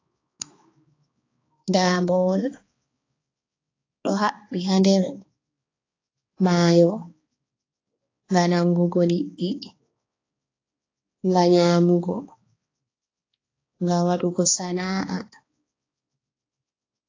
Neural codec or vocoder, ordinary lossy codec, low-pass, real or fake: codec, 16 kHz, 2 kbps, X-Codec, HuBERT features, trained on balanced general audio; AAC, 32 kbps; 7.2 kHz; fake